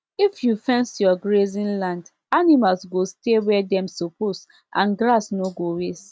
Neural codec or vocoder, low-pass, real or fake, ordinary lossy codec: none; none; real; none